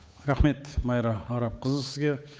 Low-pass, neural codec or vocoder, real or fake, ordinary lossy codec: none; codec, 16 kHz, 8 kbps, FunCodec, trained on Chinese and English, 25 frames a second; fake; none